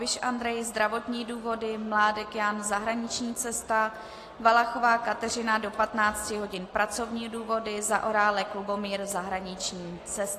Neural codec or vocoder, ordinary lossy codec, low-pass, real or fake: none; AAC, 48 kbps; 14.4 kHz; real